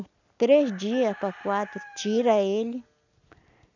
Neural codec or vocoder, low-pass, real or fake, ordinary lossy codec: none; 7.2 kHz; real; none